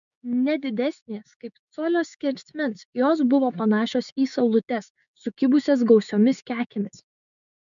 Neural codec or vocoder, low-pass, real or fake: codec, 16 kHz, 6 kbps, DAC; 7.2 kHz; fake